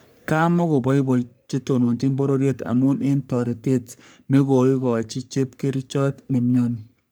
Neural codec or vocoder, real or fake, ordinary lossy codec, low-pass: codec, 44.1 kHz, 3.4 kbps, Pupu-Codec; fake; none; none